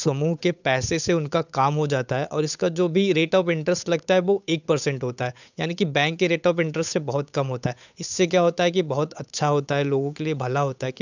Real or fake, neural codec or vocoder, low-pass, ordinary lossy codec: fake; codec, 16 kHz, 8 kbps, FunCodec, trained on Chinese and English, 25 frames a second; 7.2 kHz; none